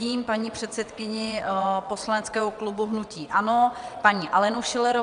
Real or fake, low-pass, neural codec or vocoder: fake; 9.9 kHz; vocoder, 22.05 kHz, 80 mel bands, WaveNeXt